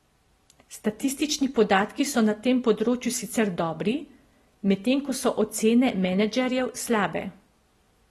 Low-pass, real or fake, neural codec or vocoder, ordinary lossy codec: 14.4 kHz; real; none; AAC, 32 kbps